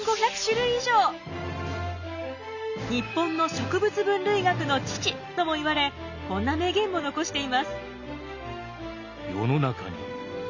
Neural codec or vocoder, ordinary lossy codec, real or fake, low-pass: none; none; real; 7.2 kHz